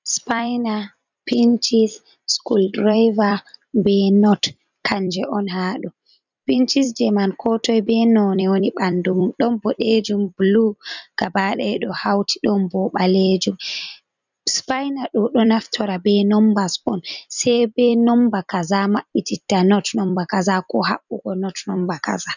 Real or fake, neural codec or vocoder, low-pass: fake; vocoder, 44.1 kHz, 128 mel bands every 256 samples, BigVGAN v2; 7.2 kHz